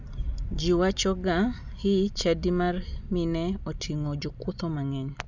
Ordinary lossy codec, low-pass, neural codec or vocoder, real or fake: none; 7.2 kHz; none; real